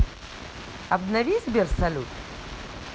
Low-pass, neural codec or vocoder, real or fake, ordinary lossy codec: none; none; real; none